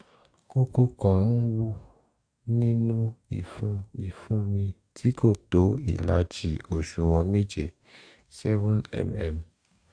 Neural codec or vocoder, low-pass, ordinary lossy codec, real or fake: codec, 44.1 kHz, 2.6 kbps, DAC; 9.9 kHz; AAC, 64 kbps; fake